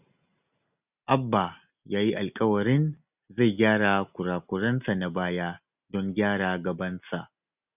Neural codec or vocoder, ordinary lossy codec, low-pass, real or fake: none; none; 3.6 kHz; real